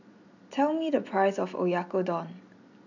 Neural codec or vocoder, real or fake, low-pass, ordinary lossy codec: none; real; 7.2 kHz; none